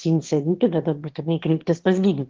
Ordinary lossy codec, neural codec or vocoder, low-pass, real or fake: Opus, 16 kbps; autoencoder, 22.05 kHz, a latent of 192 numbers a frame, VITS, trained on one speaker; 7.2 kHz; fake